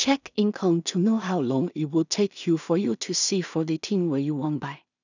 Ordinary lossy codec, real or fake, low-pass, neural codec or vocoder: none; fake; 7.2 kHz; codec, 16 kHz in and 24 kHz out, 0.4 kbps, LongCat-Audio-Codec, two codebook decoder